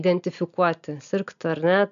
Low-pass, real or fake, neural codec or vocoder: 7.2 kHz; real; none